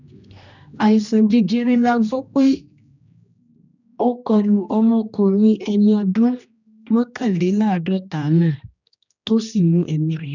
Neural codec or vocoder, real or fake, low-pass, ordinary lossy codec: codec, 16 kHz, 1 kbps, X-Codec, HuBERT features, trained on general audio; fake; 7.2 kHz; none